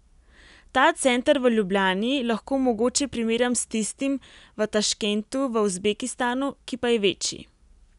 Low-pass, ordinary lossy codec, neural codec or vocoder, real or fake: 10.8 kHz; none; none; real